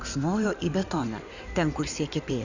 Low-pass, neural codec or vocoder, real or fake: 7.2 kHz; codec, 16 kHz in and 24 kHz out, 2.2 kbps, FireRedTTS-2 codec; fake